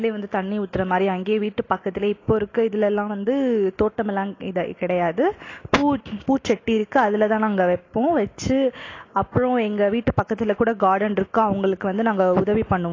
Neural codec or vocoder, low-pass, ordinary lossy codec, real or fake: none; 7.2 kHz; AAC, 32 kbps; real